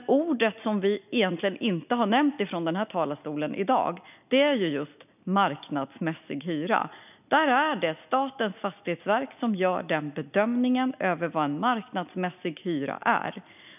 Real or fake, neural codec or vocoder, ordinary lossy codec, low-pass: real; none; none; 3.6 kHz